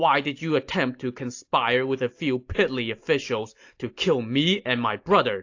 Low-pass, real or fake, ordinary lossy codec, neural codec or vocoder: 7.2 kHz; real; AAC, 48 kbps; none